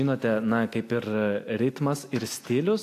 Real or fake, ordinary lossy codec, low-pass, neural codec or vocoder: real; AAC, 64 kbps; 14.4 kHz; none